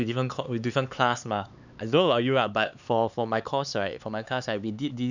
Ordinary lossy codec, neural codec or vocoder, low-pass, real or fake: none; codec, 16 kHz, 4 kbps, X-Codec, HuBERT features, trained on LibriSpeech; 7.2 kHz; fake